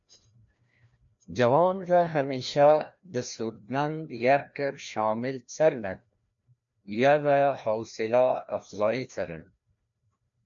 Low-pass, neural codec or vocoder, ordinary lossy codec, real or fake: 7.2 kHz; codec, 16 kHz, 1 kbps, FreqCodec, larger model; MP3, 64 kbps; fake